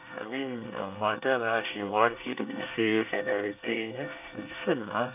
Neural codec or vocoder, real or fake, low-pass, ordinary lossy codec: codec, 24 kHz, 1 kbps, SNAC; fake; 3.6 kHz; none